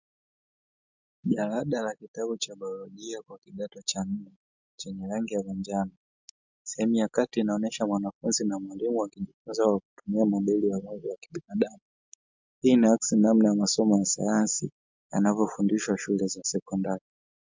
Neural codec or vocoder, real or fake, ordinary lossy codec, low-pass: none; real; MP3, 64 kbps; 7.2 kHz